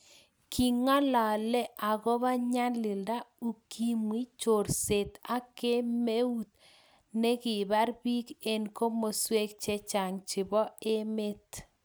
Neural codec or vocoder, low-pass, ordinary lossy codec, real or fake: none; none; none; real